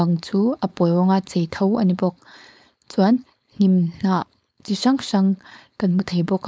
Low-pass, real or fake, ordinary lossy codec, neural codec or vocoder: none; fake; none; codec, 16 kHz, 4.8 kbps, FACodec